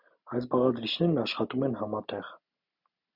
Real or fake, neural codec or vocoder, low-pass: real; none; 5.4 kHz